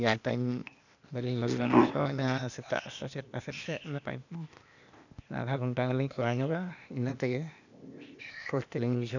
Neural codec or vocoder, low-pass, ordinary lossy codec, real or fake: codec, 16 kHz, 0.8 kbps, ZipCodec; 7.2 kHz; none; fake